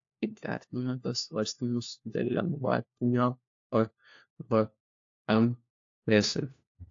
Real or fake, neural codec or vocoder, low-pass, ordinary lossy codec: fake; codec, 16 kHz, 1 kbps, FunCodec, trained on LibriTTS, 50 frames a second; 7.2 kHz; AAC, 48 kbps